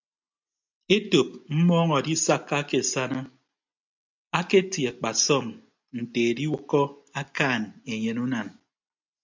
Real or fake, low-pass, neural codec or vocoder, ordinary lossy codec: real; 7.2 kHz; none; MP3, 64 kbps